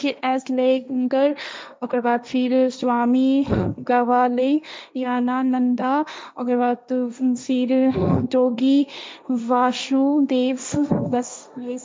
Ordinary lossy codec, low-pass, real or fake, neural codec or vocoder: none; none; fake; codec, 16 kHz, 1.1 kbps, Voila-Tokenizer